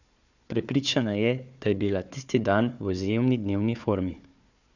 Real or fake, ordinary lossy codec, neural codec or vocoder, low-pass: fake; none; codec, 16 kHz, 4 kbps, FunCodec, trained on Chinese and English, 50 frames a second; 7.2 kHz